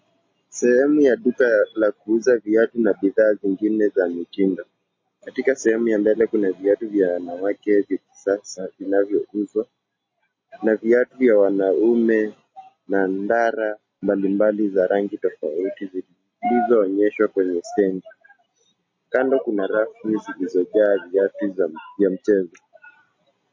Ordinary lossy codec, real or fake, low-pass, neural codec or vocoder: MP3, 32 kbps; real; 7.2 kHz; none